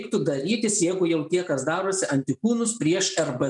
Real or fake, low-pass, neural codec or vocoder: real; 10.8 kHz; none